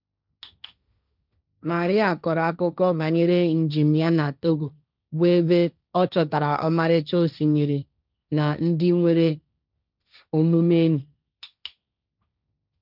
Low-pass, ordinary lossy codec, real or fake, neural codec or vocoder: 5.4 kHz; none; fake; codec, 16 kHz, 1.1 kbps, Voila-Tokenizer